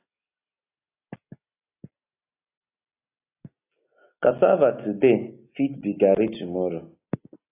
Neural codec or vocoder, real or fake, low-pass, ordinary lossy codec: none; real; 3.6 kHz; AAC, 24 kbps